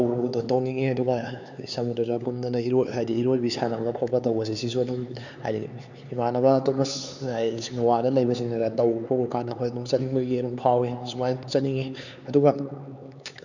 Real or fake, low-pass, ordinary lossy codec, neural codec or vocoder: fake; 7.2 kHz; none; codec, 16 kHz, 4 kbps, X-Codec, HuBERT features, trained on LibriSpeech